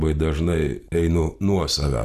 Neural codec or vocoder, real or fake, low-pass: none; real; 14.4 kHz